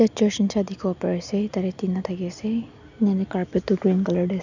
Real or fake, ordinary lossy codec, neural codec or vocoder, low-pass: real; none; none; 7.2 kHz